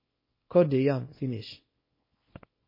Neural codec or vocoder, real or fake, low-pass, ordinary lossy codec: codec, 24 kHz, 0.9 kbps, WavTokenizer, small release; fake; 5.4 kHz; MP3, 24 kbps